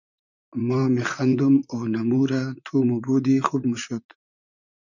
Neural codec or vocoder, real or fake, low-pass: vocoder, 44.1 kHz, 128 mel bands, Pupu-Vocoder; fake; 7.2 kHz